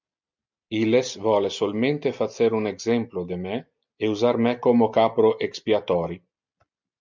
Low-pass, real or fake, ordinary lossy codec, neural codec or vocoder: 7.2 kHz; real; MP3, 64 kbps; none